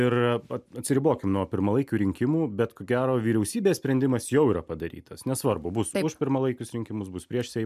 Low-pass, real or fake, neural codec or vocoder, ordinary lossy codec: 14.4 kHz; real; none; MP3, 96 kbps